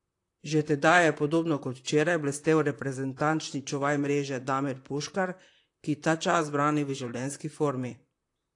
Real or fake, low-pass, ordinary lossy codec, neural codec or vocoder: fake; 10.8 kHz; AAC, 48 kbps; vocoder, 44.1 kHz, 128 mel bands, Pupu-Vocoder